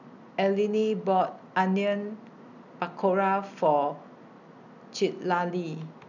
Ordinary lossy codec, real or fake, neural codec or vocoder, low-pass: none; real; none; 7.2 kHz